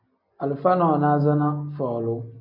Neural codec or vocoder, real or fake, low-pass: none; real; 5.4 kHz